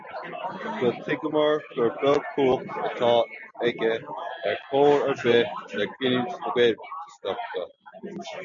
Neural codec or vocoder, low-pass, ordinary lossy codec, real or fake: none; 7.2 kHz; MP3, 96 kbps; real